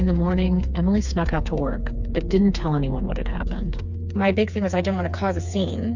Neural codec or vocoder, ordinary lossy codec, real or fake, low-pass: codec, 16 kHz, 4 kbps, FreqCodec, smaller model; MP3, 64 kbps; fake; 7.2 kHz